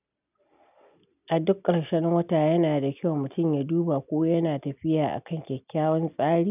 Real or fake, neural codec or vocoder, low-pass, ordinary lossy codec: real; none; 3.6 kHz; none